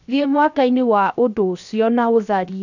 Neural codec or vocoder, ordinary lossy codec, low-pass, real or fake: codec, 16 kHz, 0.7 kbps, FocalCodec; none; 7.2 kHz; fake